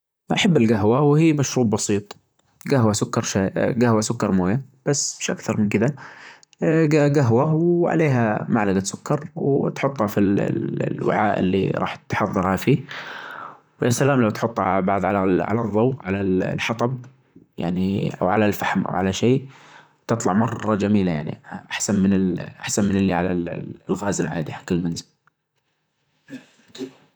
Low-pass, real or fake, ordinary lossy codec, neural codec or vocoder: none; fake; none; vocoder, 44.1 kHz, 128 mel bands, Pupu-Vocoder